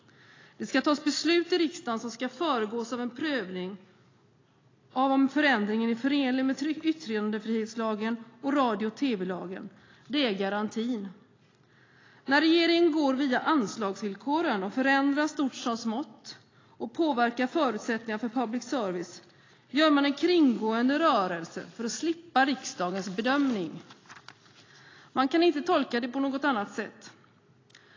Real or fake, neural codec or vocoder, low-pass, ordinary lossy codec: real; none; 7.2 kHz; AAC, 32 kbps